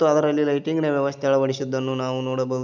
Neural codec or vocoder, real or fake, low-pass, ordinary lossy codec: none; real; 7.2 kHz; none